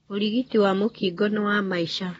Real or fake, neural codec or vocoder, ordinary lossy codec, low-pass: real; none; AAC, 24 kbps; 19.8 kHz